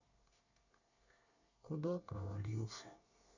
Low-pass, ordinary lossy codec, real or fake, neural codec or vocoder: 7.2 kHz; none; fake; codec, 24 kHz, 1 kbps, SNAC